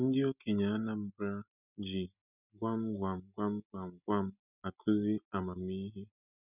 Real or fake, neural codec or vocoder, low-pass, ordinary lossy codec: real; none; 3.6 kHz; none